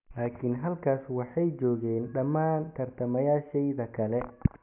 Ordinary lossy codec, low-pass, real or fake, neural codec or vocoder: none; 3.6 kHz; real; none